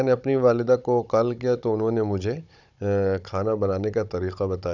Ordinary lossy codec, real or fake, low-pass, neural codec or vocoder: none; fake; 7.2 kHz; codec, 16 kHz, 16 kbps, FunCodec, trained on Chinese and English, 50 frames a second